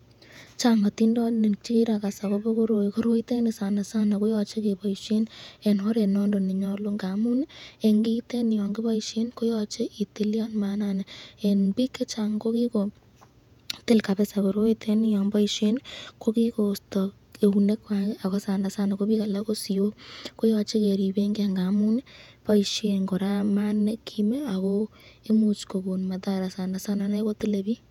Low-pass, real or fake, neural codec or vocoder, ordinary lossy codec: 19.8 kHz; fake; vocoder, 48 kHz, 128 mel bands, Vocos; none